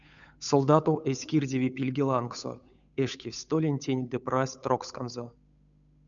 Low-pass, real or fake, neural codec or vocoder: 7.2 kHz; fake; codec, 16 kHz, 8 kbps, FunCodec, trained on Chinese and English, 25 frames a second